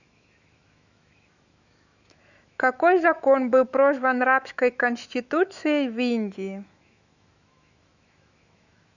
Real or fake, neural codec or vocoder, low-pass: fake; autoencoder, 48 kHz, 128 numbers a frame, DAC-VAE, trained on Japanese speech; 7.2 kHz